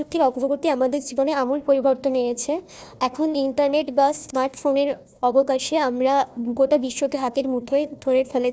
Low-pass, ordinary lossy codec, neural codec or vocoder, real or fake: none; none; codec, 16 kHz, 1 kbps, FunCodec, trained on Chinese and English, 50 frames a second; fake